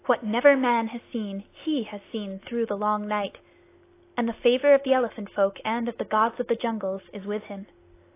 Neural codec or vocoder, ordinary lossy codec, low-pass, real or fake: none; AAC, 24 kbps; 3.6 kHz; real